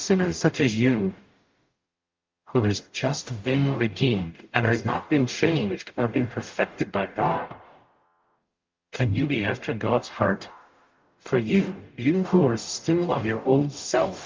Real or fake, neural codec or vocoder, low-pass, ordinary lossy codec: fake; codec, 44.1 kHz, 0.9 kbps, DAC; 7.2 kHz; Opus, 24 kbps